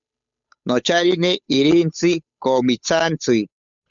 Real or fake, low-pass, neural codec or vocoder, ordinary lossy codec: fake; 7.2 kHz; codec, 16 kHz, 8 kbps, FunCodec, trained on Chinese and English, 25 frames a second; MP3, 96 kbps